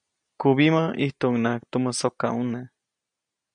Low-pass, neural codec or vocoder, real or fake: 9.9 kHz; none; real